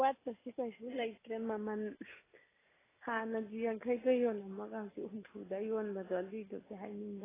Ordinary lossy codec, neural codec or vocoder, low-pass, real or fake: AAC, 16 kbps; none; 3.6 kHz; real